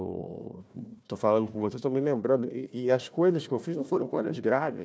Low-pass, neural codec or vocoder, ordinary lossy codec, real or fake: none; codec, 16 kHz, 1 kbps, FunCodec, trained on Chinese and English, 50 frames a second; none; fake